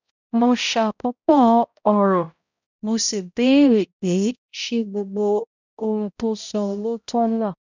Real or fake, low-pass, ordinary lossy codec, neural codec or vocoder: fake; 7.2 kHz; none; codec, 16 kHz, 0.5 kbps, X-Codec, HuBERT features, trained on balanced general audio